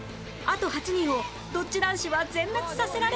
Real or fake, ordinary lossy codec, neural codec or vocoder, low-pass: real; none; none; none